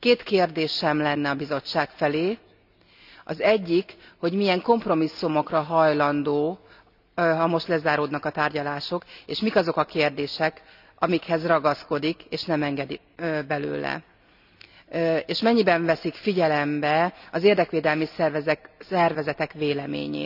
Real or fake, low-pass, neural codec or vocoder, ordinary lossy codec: real; 5.4 kHz; none; none